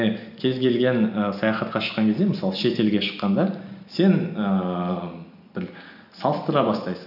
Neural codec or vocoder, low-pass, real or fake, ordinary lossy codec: none; 5.4 kHz; real; none